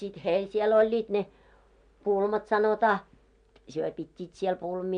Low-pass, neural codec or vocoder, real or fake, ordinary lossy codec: 9.9 kHz; none; real; MP3, 64 kbps